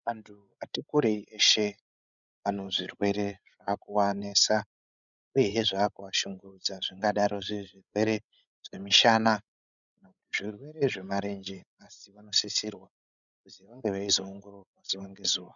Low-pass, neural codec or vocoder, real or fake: 7.2 kHz; codec, 16 kHz, 16 kbps, FreqCodec, larger model; fake